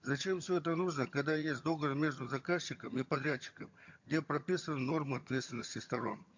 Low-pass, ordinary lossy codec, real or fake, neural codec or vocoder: 7.2 kHz; MP3, 48 kbps; fake; vocoder, 22.05 kHz, 80 mel bands, HiFi-GAN